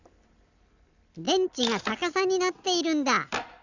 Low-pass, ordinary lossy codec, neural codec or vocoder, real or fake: 7.2 kHz; none; none; real